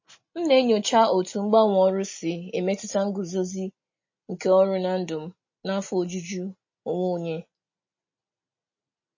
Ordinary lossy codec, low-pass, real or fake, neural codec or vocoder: MP3, 32 kbps; 7.2 kHz; real; none